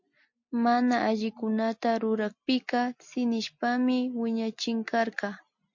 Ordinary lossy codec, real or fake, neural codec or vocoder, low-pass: MP3, 48 kbps; real; none; 7.2 kHz